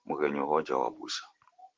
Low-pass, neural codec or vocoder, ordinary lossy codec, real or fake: 7.2 kHz; vocoder, 44.1 kHz, 128 mel bands every 512 samples, BigVGAN v2; Opus, 16 kbps; fake